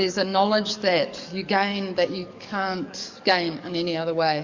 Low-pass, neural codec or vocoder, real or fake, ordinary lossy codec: 7.2 kHz; codec, 44.1 kHz, 7.8 kbps, DAC; fake; Opus, 64 kbps